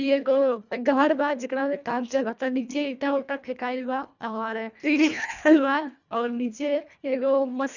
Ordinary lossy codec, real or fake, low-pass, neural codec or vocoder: none; fake; 7.2 kHz; codec, 24 kHz, 1.5 kbps, HILCodec